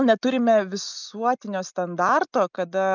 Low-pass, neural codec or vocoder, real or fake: 7.2 kHz; none; real